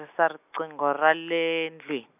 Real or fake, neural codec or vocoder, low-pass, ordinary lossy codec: real; none; 3.6 kHz; AAC, 32 kbps